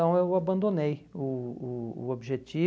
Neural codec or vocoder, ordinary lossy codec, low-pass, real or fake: none; none; none; real